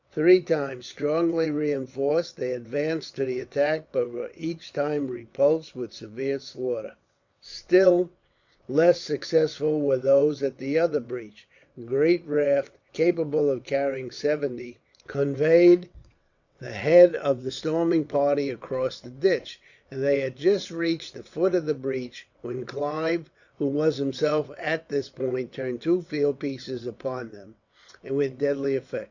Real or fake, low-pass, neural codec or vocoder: fake; 7.2 kHz; vocoder, 22.05 kHz, 80 mel bands, WaveNeXt